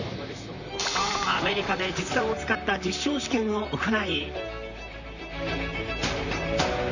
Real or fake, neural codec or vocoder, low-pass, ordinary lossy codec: fake; vocoder, 44.1 kHz, 128 mel bands, Pupu-Vocoder; 7.2 kHz; none